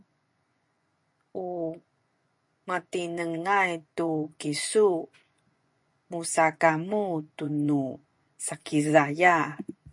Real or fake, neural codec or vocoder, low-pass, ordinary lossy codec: fake; vocoder, 24 kHz, 100 mel bands, Vocos; 10.8 kHz; MP3, 48 kbps